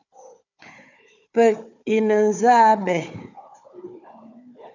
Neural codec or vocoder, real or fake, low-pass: codec, 16 kHz, 4 kbps, FunCodec, trained on Chinese and English, 50 frames a second; fake; 7.2 kHz